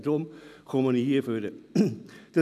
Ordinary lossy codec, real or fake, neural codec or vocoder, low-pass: none; real; none; 14.4 kHz